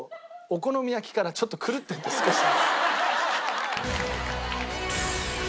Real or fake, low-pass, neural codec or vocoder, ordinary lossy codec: real; none; none; none